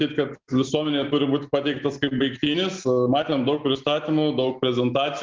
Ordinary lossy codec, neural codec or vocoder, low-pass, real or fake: Opus, 24 kbps; none; 7.2 kHz; real